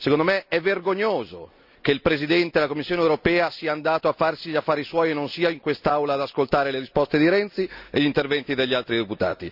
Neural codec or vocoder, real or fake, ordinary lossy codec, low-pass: none; real; none; 5.4 kHz